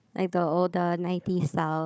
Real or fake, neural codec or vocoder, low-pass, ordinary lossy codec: fake; codec, 16 kHz, 4 kbps, FunCodec, trained on Chinese and English, 50 frames a second; none; none